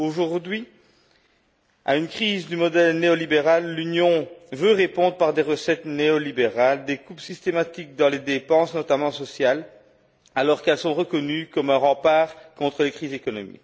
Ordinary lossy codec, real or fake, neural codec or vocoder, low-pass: none; real; none; none